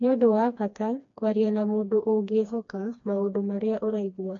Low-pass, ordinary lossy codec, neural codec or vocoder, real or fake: 7.2 kHz; MP3, 48 kbps; codec, 16 kHz, 2 kbps, FreqCodec, smaller model; fake